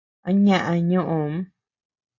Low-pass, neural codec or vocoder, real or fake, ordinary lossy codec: 7.2 kHz; none; real; MP3, 32 kbps